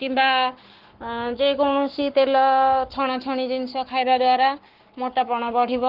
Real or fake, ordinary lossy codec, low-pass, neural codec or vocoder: fake; Opus, 16 kbps; 5.4 kHz; codec, 44.1 kHz, 7.8 kbps, Pupu-Codec